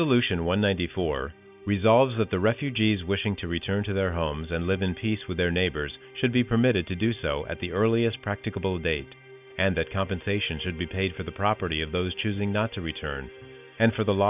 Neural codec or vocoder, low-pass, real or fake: none; 3.6 kHz; real